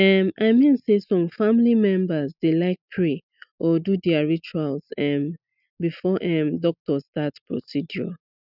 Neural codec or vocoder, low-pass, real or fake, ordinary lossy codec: none; 5.4 kHz; real; none